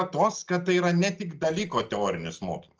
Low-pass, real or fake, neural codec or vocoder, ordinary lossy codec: 7.2 kHz; real; none; Opus, 24 kbps